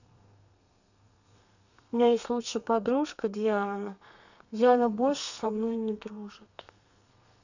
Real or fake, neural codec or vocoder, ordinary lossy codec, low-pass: fake; codec, 32 kHz, 1.9 kbps, SNAC; none; 7.2 kHz